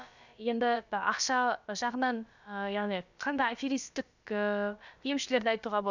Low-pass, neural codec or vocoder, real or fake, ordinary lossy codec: 7.2 kHz; codec, 16 kHz, about 1 kbps, DyCAST, with the encoder's durations; fake; none